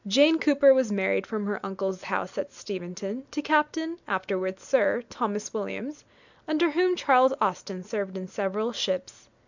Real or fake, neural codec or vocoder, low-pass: real; none; 7.2 kHz